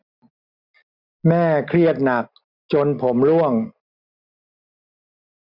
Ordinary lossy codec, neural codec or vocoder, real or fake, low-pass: Opus, 64 kbps; none; real; 5.4 kHz